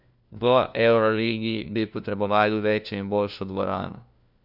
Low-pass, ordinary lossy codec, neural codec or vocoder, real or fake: 5.4 kHz; none; codec, 16 kHz, 1 kbps, FunCodec, trained on LibriTTS, 50 frames a second; fake